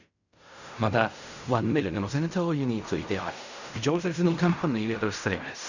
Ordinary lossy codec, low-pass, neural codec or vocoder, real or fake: none; 7.2 kHz; codec, 16 kHz in and 24 kHz out, 0.4 kbps, LongCat-Audio-Codec, fine tuned four codebook decoder; fake